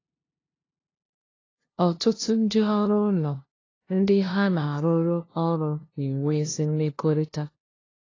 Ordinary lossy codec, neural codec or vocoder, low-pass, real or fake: AAC, 32 kbps; codec, 16 kHz, 0.5 kbps, FunCodec, trained on LibriTTS, 25 frames a second; 7.2 kHz; fake